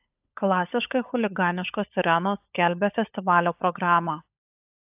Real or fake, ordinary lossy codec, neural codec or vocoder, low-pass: fake; AAC, 32 kbps; codec, 16 kHz, 16 kbps, FunCodec, trained on LibriTTS, 50 frames a second; 3.6 kHz